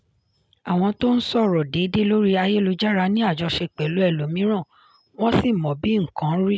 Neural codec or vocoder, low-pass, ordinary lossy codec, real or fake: none; none; none; real